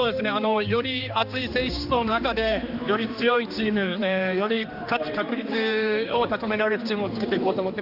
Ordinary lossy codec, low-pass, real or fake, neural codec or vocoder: Opus, 64 kbps; 5.4 kHz; fake; codec, 16 kHz, 2 kbps, X-Codec, HuBERT features, trained on general audio